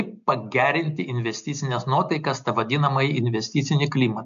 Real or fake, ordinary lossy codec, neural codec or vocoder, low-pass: real; AAC, 96 kbps; none; 7.2 kHz